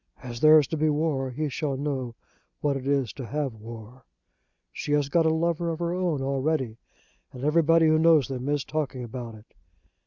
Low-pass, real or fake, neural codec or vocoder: 7.2 kHz; real; none